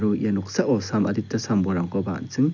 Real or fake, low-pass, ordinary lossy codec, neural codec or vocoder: real; 7.2 kHz; none; none